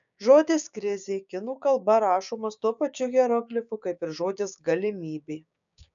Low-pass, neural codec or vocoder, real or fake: 7.2 kHz; codec, 16 kHz, 6 kbps, DAC; fake